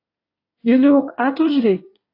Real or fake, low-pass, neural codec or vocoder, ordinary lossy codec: fake; 5.4 kHz; codec, 24 kHz, 1 kbps, SNAC; AAC, 32 kbps